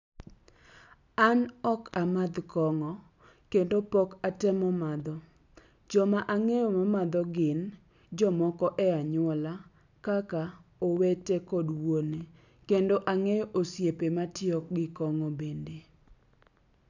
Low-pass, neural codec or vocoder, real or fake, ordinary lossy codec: 7.2 kHz; none; real; none